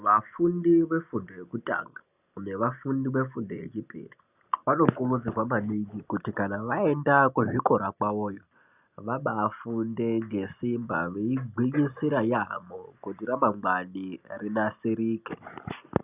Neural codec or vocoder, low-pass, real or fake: none; 3.6 kHz; real